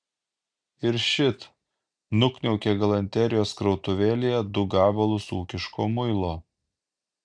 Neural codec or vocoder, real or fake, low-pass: none; real; 9.9 kHz